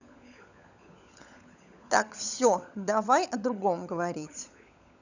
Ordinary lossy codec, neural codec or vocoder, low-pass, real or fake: none; codec, 16 kHz, 16 kbps, FunCodec, trained on LibriTTS, 50 frames a second; 7.2 kHz; fake